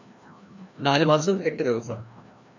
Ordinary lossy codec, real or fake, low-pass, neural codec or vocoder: MP3, 48 kbps; fake; 7.2 kHz; codec, 16 kHz, 1 kbps, FreqCodec, larger model